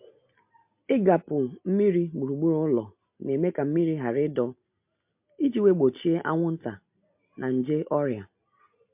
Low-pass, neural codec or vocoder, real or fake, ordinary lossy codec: 3.6 kHz; none; real; MP3, 32 kbps